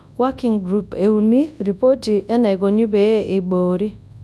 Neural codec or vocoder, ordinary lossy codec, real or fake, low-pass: codec, 24 kHz, 0.9 kbps, WavTokenizer, large speech release; none; fake; none